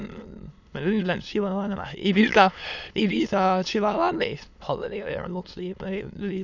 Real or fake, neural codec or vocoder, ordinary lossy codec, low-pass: fake; autoencoder, 22.05 kHz, a latent of 192 numbers a frame, VITS, trained on many speakers; none; 7.2 kHz